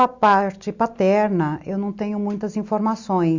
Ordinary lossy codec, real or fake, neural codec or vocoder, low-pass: Opus, 64 kbps; real; none; 7.2 kHz